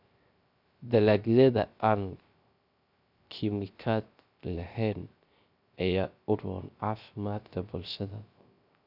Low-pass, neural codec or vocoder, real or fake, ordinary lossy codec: 5.4 kHz; codec, 16 kHz, 0.3 kbps, FocalCodec; fake; none